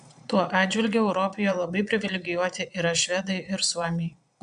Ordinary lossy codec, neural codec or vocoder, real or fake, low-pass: AAC, 96 kbps; vocoder, 22.05 kHz, 80 mel bands, Vocos; fake; 9.9 kHz